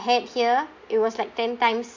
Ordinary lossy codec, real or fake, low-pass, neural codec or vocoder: MP3, 48 kbps; real; 7.2 kHz; none